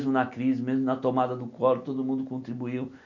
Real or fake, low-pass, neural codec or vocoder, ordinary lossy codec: real; 7.2 kHz; none; none